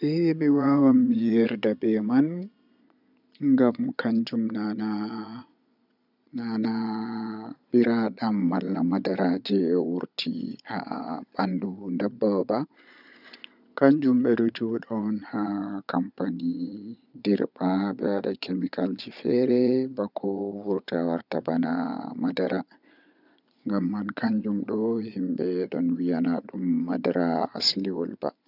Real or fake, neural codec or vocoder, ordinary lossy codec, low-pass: fake; vocoder, 22.05 kHz, 80 mel bands, Vocos; none; 5.4 kHz